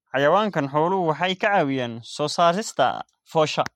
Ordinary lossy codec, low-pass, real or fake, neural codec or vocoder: MP3, 64 kbps; 19.8 kHz; real; none